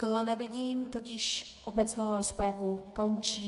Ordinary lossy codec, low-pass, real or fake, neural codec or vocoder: AAC, 64 kbps; 10.8 kHz; fake; codec, 24 kHz, 0.9 kbps, WavTokenizer, medium music audio release